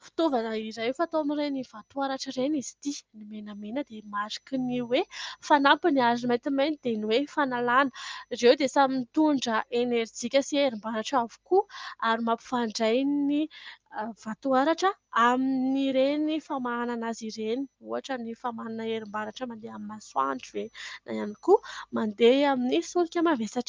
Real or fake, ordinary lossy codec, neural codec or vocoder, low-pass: real; Opus, 24 kbps; none; 7.2 kHz